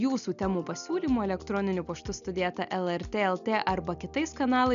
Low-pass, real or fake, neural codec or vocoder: 7.2 kHz; real; none